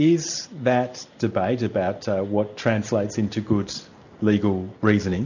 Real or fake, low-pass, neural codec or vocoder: real; 7.2 kHz; none